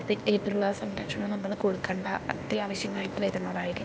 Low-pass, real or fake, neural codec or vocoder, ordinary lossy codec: none; fake; codec, 16 kHz, 0.8 kbps, ZipCodec; none